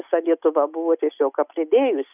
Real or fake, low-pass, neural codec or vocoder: real; 3.6 kHz; none